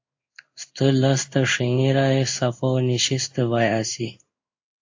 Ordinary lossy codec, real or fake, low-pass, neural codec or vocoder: AAC, 48 kbps; fake; 7.2 kHz; codec, 16 kHz in and 24 kHz out, 1 kbps, XY-Tokenizer